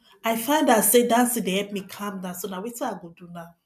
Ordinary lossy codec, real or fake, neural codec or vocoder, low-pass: none; real; none; 14.4 kHz